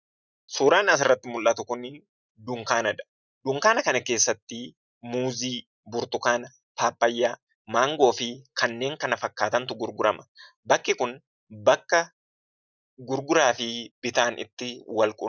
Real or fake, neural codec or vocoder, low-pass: real; none; 7.2 kHz